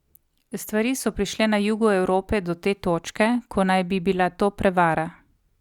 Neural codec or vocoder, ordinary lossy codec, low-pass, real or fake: none; Opus, 64 kbps; 19.8 kHz; real